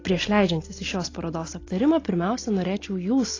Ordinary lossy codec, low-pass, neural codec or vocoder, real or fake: AAC, 32 kbps; 7.2 kHz; none; real